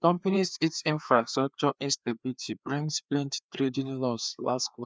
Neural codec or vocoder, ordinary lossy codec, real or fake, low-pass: codec, 16 kHz, 2 kbps, FreqCodec, larger model; none; fake; none